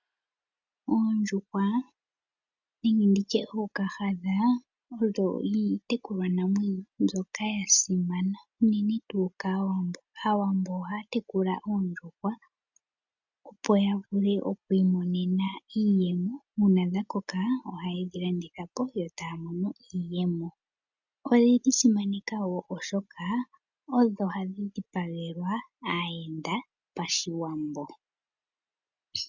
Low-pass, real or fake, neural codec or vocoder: 7.2 kHz; real; none